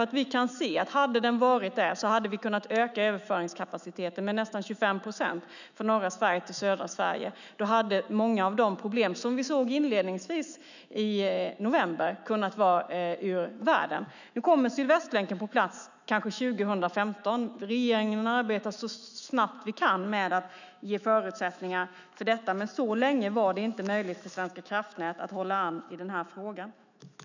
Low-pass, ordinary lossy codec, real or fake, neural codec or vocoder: 7.2 kHz; none; fake; autoencoder, 48 kHz, 128 numbers a frame, DAC-VAE, trained on Japanese speech